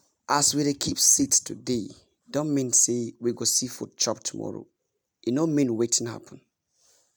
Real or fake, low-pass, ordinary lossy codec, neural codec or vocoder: real; none; none; none